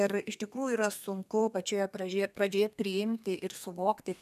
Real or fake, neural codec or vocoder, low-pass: fake; codec, 32 kHz, 1.9 kbps, SNAC; 14.4 kHz